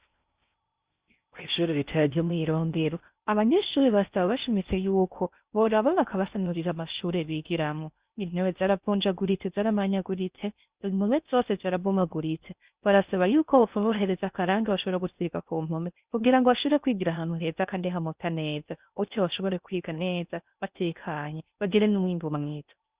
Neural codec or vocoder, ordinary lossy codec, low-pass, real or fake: codec, 16 kHz in and 24 kHz out, 0.6 kbps, FocalCodec, streaming, 4096 codes; Opus, 64 kbps; 3.6 kHz; fake